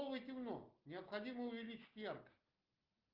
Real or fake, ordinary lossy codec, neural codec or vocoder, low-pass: real; Opus, 32 kbps; none; 5.4 kHz